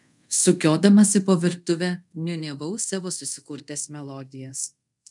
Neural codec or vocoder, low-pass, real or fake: codec, 24 kHz, 0.5 kbps, DualCodec; 10.8 kHz; fake